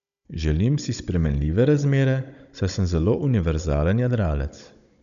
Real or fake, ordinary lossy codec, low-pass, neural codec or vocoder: fake; MP3, 96 kbps; 7.2 kHz; codec, 16 kHz, 16 kbps, FunCodec, trained on Chinese and English, 50 frames a second